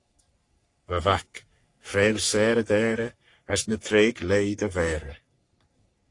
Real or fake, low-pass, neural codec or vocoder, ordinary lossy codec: fake; 10.8 kHz; codec, 44.1 kHz, 3.4 kbps, Pupu-Codec; AAC, 48 kbps